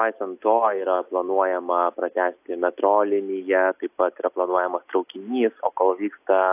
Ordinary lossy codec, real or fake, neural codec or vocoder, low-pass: AAC, 32 kbps; real; none; 3.6 kHz